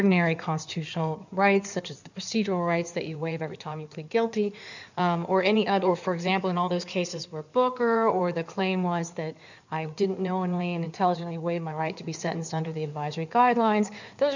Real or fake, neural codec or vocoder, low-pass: fake; codec, 16 kHz in and 24 kHz out, 2.2 kbps, FireRedTTS-2 codec; 7.2 kHz